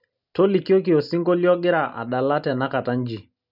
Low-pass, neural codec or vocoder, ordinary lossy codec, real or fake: 5.4 kHz; none; none; real